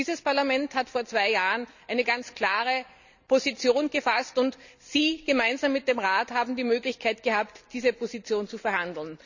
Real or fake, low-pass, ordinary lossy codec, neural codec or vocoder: real; 7.2 kHz; none; none